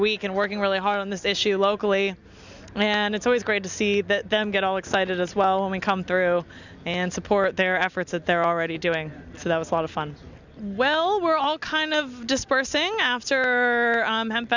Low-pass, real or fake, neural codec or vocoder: 7.2 kHz; real; none